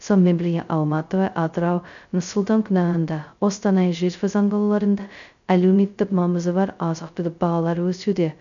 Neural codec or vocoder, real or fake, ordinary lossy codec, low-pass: codec, 16 kHz, 0.2 kbps, FocalCodec; fake; none; 7.2 kHz